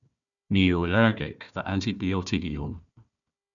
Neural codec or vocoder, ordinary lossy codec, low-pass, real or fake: codec, 16 kHz, 1 kbps, FunCodec, trained on Chinese and English, 50 frames a second; MP3, 96 kbps; 7.2 kHz; fake